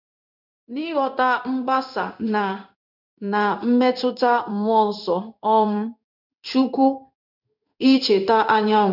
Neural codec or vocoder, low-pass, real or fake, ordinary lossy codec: codec, 16 kHz in and 24 kHz out, 1 kbps, XY-Tokenizer; 5.4 kHz; fake; none